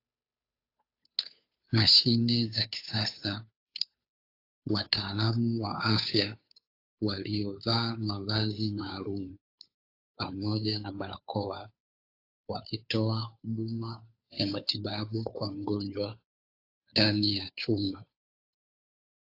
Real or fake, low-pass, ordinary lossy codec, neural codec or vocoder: fake; 5.4 kHz; AAC, 32 kbps; codec, 16 kHz, 2 kbps, FunCodec, trained on Chinese and English, 25 frames a second